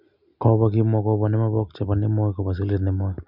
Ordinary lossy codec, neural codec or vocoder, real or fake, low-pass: none; none; real; 5.4 kHz